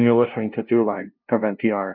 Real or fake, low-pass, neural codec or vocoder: fake; 5.4 kHz; codec, 16 kHz, 0.5 kbps, FunCodec, trained on LibriTTS, 25 frames a second